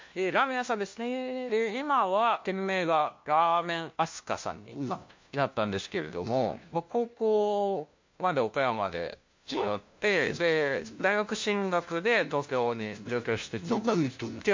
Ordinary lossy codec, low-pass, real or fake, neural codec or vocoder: MP3, 48 kbps; 7.2 kHz; fake; codec, 16 kHz, 1 kbps, FunCodec, trained on LibriTTS, 50 frames a second